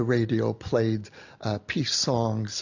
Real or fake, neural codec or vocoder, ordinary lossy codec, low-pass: real; none; AAC, 48 kbps; 7.2 kHz